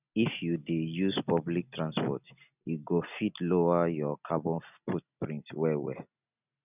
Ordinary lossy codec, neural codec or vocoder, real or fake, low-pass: none; none; real; 3.6 kHz